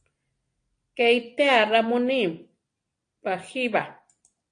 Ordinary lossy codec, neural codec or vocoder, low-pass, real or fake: AAC, 48 kbps; none; 9.9 kHz; real